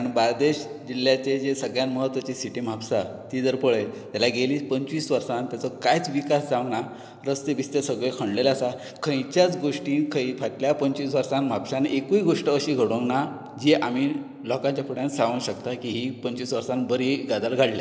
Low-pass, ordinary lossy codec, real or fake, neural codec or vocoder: none; none; real; none